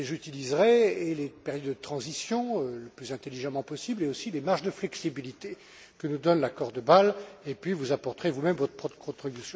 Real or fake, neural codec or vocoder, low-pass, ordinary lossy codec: real; none; none; none